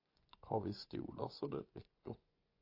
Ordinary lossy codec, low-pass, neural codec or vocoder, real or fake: AAC, 32 kbps; 5.4 kHz; vocoder, 24 kHz, 100 mel bands, Vocos; fake